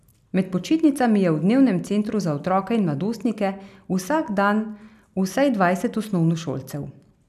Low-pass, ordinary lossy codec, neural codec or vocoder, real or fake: 14.4 kHz; none; none; real